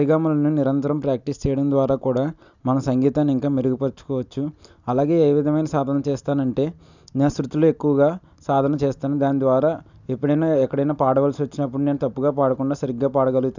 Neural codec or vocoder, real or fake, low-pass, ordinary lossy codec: none; real; 7.2 kHz; none